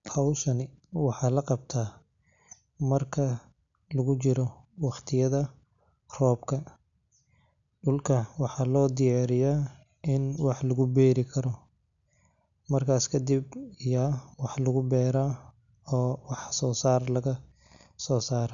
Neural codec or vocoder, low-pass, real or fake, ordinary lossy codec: none; 7.2 kHz; real; none